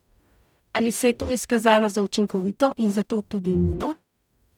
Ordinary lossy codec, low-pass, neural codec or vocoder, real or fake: none; 19.8 kHz; codec, 44.1 kHz, 0.9 kbps, DAC; fake